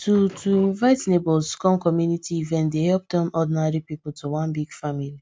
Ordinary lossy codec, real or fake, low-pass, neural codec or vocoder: none; real; none; none